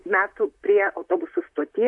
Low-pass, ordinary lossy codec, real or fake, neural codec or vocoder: 10.8 kHz; AAC, 64 kbps; fake; vocoder, 44.1 kHz, 128 mel bands, Pupu-Vocoder